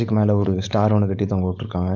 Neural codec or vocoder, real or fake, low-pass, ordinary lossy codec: codec, 16 kHz, 4.8 kbps, FACodec; fake; 7.2 kHz; none